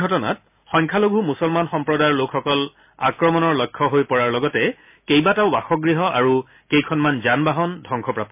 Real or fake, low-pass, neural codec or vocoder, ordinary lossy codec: real; 3.6 kHz; none; MP3, 32 kbps